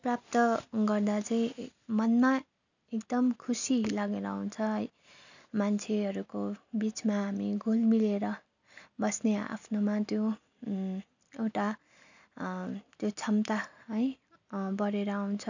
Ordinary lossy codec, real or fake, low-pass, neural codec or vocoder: none; real; 7.2 kHz; none